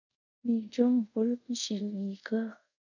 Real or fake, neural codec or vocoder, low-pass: fake; codec, 24 kHz, 0.5 kbps, DualCodec; 7.2 kHz